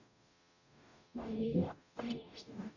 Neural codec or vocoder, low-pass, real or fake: codec, 44.1 kHz, 0.9 kbps, DAC; 7.2 kHz; fake